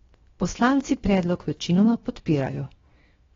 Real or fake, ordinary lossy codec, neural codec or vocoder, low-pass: fake; AAC, 24 kbps; codec, 16 kHz, 0.7 kbps, FocalCodec; 7.2 kHz